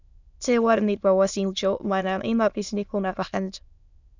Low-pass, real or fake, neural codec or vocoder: 7.2 kHz; fake; autoencoder, 22.05 kHz, a latent of 192 numbers a frame, VITS, trained on many speakers